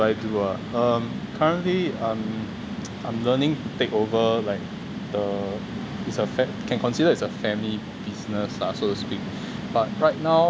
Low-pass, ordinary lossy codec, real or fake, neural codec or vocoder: none; none; real; none